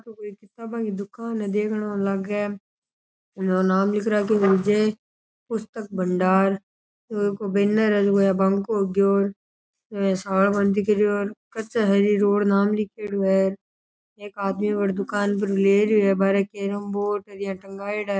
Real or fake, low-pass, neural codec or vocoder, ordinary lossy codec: real; none; none; none